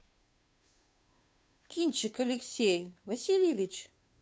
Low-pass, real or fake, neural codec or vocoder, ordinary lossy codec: none; fake; codec, 16 kHz, 2 kbps, FunCodec, trained on Chinese and English, 25 frames a second; none